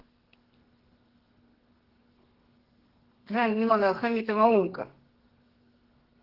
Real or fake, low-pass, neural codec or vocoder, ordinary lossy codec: fake; 5.4 kHz; codec, 32 kHz, 1.9 kbps, SNAC; Opus, 16 kbps